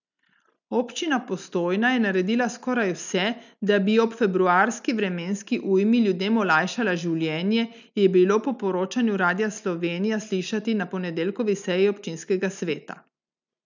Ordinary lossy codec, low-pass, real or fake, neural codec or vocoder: none; 7.2 kHz; real; none